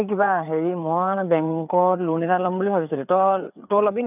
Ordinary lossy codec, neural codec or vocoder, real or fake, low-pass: none; codec, 16 kHz, 4 kbps, FreqCodec, larger model; fake; 3.6 kHz